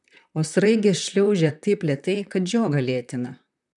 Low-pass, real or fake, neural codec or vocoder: 10.8 kHz; fake; vocoder, 44.1 kHz, 128 mel bands, Pupu-Vocoder